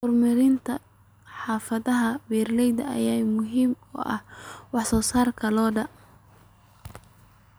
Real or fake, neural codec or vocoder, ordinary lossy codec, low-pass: real; none; none; none